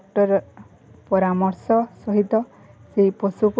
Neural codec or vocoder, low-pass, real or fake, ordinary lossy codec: none; none; real; none